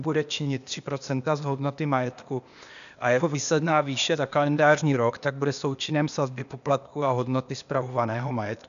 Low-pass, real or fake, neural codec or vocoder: 7.2 kHz; fake; codec, 16 kHz, 0.8 kbps, ZipCodec